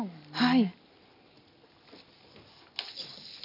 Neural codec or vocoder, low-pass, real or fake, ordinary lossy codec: none; 5.4 kHz; real; none